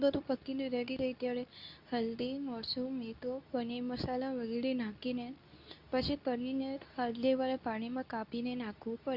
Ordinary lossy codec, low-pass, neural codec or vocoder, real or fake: none; 5.4 kHz; codec, 24 kHz, 0.9 kbps, WavTokenizer, medium speech release version 2; fake